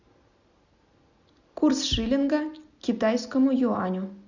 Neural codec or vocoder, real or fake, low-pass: none; real; 7.2 kHz